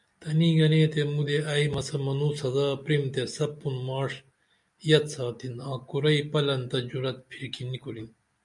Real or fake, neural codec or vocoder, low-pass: real; none; 10.8 kHz